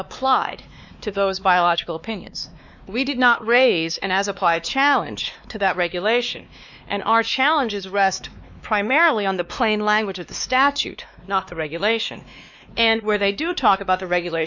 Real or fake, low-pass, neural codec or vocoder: fake; 7.2 kHz; codec, 16 kHz, 2 kbps, X-Codec, WavLM features, trained on Multilingual LibriSpeech